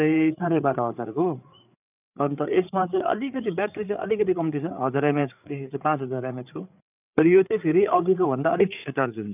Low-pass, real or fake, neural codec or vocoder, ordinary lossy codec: 3.6 kHz; fake; codec, 16 kHz, 8 kbps, FreqCodec, larger model; none